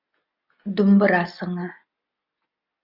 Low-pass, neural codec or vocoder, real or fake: 5.4 kHz; none; real